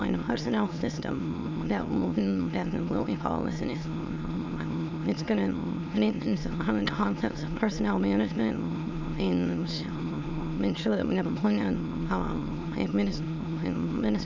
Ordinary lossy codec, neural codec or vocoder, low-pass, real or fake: MP3, 64 kbps; autoencoder, 22.05 kHz, a latent of 192 numbers a frame, VITS, trained on many speakers; 7.2 kHz; fake